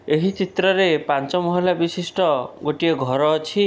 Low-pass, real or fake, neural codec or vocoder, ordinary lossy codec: none; real; none; none